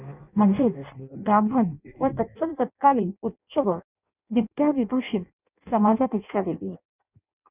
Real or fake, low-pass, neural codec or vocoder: fake; 3.6 kHz; codec, 16 kHz in and 24 kHz out, 0.6 kbps, FireRedTTS-2 codec